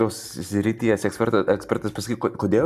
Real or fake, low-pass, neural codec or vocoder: real; 14.4 kHz; none